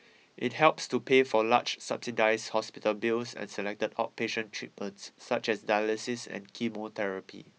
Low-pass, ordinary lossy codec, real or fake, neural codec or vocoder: none; none; real; none